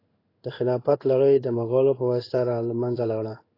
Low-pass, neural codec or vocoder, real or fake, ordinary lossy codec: 5.4 kHz; codec, 16 kHz in and 24 kHz out, 1 kbps, XY-Tokenizer; fake; AAC, 24 kbps